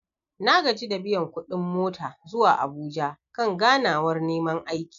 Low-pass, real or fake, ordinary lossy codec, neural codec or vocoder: 7.2 kHz; real; MP3, 96 kbps; none